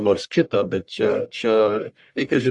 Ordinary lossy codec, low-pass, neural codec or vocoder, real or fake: Opus, 64 kbps; 10.8 kHz; codec, 44.1 kHz, 1.7 kbps, Pupu-Codec; fake